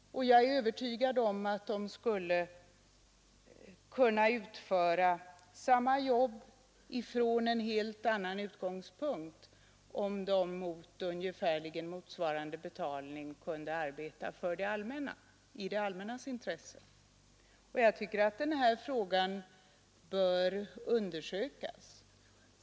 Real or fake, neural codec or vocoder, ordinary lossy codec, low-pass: real; none; none; none